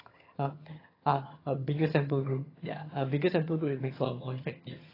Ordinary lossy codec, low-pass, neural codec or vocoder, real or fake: AAC, 24 kbps; 5.4 kHz; vocoder, 22.05 kHz, 80 mel bands, HiFi-GAN; fake